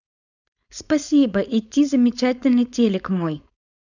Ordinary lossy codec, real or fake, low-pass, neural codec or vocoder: none; fake; 7.2 kHz; codec, 16 kHz, 4.8 kbps, FACodec